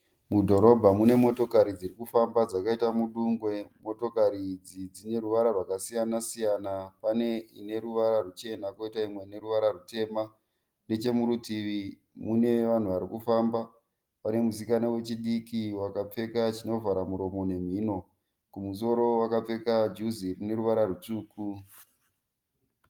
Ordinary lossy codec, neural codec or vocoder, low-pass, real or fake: Opus, 24 kbps; none; 19.8 kHz; real